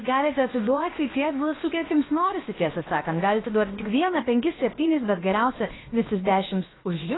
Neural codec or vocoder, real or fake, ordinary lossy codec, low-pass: codec, 16 kHz, about 1 kbps, DyCAST, with the encoder's durations; fake; AAC, 16 kbps; 7.2 kHz